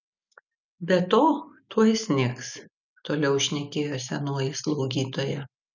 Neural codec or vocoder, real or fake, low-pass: none; real; 7.2 kHz